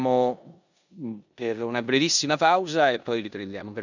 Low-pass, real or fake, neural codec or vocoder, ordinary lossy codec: 7.2 kHz; fake; codec, 16 kHz in and 24 kHz out, 0.9 kbps, LongCat-Audio-Codec, four codebook decoder; none